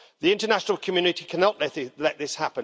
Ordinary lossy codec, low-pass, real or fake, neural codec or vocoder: none; none; real; none